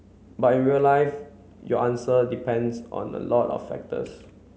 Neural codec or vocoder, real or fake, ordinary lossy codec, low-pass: none; real; none; none